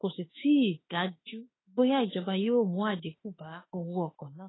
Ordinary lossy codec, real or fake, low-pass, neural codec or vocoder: AAC, 16 kbps; fake; 7.2 kHz; autoencoder, 48 kHz, 32 numbers a frame, DAC-VAE, trained on Japanese speech